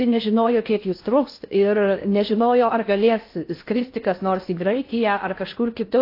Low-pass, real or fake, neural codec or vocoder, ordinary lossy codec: 5.4 kHz; fake; codec, 16 kHz in and 24 kHz out, 0.6 kbps, FocalCodec, streaming, 4096 codes; AAC, 32 kbps